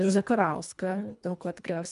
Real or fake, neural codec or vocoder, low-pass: fake; codec, 24 kHz, 1.5 kbps, HILCodec; 10.8 kHz